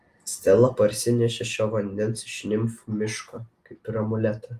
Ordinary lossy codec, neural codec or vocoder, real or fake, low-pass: Opus, 24 kbps; none; real; 14.4 kHz